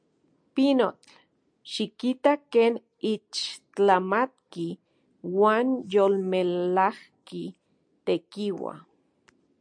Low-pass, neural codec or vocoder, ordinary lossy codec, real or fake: 9.9 kHz; none; MP3, 64 kbps; real